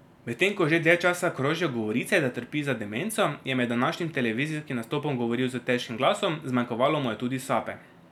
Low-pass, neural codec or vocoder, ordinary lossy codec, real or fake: 19.8 kHz; none; none; real